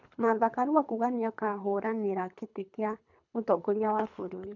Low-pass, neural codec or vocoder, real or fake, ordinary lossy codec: 7.2 kHz; codec, 24 kHz, 3 kbps, HILCodec; fake; none